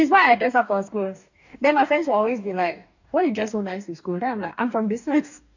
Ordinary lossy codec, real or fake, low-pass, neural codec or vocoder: none; fake; 7.2 kHz; codec, 44.1 kHz, 2.6 kbps, DAC